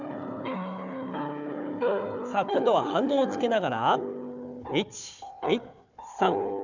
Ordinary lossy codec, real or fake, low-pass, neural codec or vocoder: none; fake; 7.2 kHz; codec, 16 kHz, 4 kbps, FunCodec, trained on Chinese and English, 50 frames a second